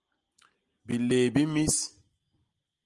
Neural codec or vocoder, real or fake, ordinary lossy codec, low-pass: none; real; Opus, 24 kbps; 10.8 kHz